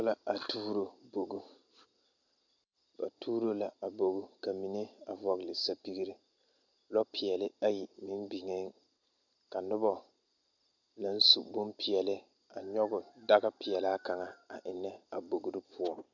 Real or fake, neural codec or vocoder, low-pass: real; none; 7.2 kHz